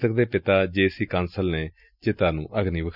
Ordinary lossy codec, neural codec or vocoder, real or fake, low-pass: none; none; real; 5.4 kHz